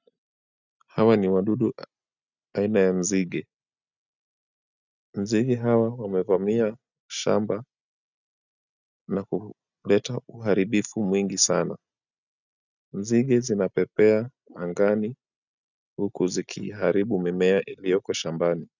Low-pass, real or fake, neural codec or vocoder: 7.2 kHz; real; none